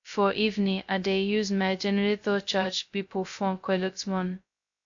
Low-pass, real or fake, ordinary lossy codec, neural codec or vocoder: 7.2 kHz; fake; AAC, 64 kbps; codec, 16 kHz, 0.2 kbps, FocalCodec